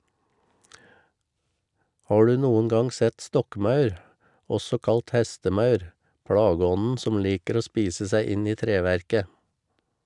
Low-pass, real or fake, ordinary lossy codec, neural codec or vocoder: 10.8 kHz; real; none; none